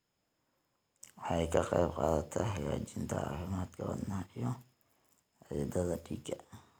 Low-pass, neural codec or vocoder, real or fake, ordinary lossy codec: none; none; real; none